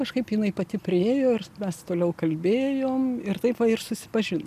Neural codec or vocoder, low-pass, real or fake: none; 14.4 kHz; real